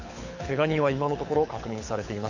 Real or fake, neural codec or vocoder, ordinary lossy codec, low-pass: fake; codec, 24 kHz, 6 kbps, HILCodec; none; 7.2 kHz